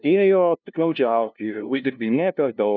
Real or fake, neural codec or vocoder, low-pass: fake; codec, 16 kHz, 0.5 kbps, FunCodec, trained on LibriTTS, 25 frames a second; 7.2 kHz